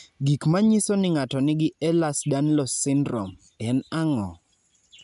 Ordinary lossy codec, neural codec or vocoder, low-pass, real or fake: none; none; 10.8 kHz; real